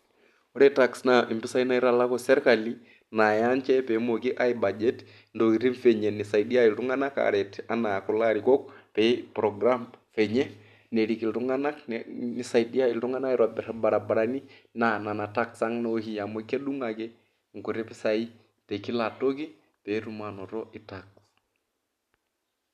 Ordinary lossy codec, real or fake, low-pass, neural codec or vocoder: none; real; 14.4 kHz; none